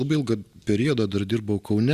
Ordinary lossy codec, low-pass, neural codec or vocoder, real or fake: Opus, 64 kbps; 14.4 kHz; none; real